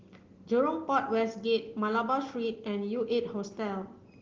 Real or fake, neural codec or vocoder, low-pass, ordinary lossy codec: real; none; 7.2 kHz; Opus, 16 kbps